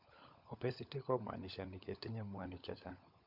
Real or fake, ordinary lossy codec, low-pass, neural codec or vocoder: fake; none; 5.4 kHz; codec, 16 kHz, 16 kbps, FunCodec, trained on LibriTTS, 50 frames a second